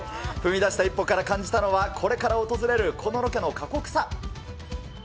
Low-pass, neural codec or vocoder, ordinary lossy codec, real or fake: none; none; none; real